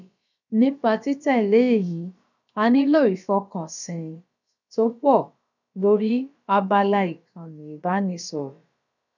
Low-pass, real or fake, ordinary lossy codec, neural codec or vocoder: 7.2 kHz; fake; none; codec, 16 kHz, about 1 kbps, DyCAST, with the encoder's durations